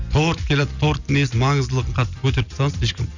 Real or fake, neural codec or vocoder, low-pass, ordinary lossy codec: real; none; 7.2 kHz; none